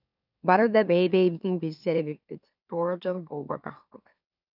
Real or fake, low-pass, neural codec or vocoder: fake; 5.4 kHz; autoencoder, 44.1 kHz, a latent of 192 numbers a frame, MeloTTS